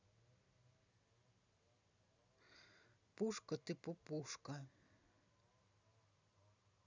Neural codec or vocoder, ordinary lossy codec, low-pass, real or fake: none; none; 7.2 kHz; real